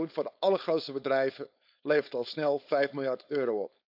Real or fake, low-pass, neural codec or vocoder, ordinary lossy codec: fake; 5.4 kHz; codec, 16 kHz, 4.8 kbps, FACodec; AAC, 48 kbps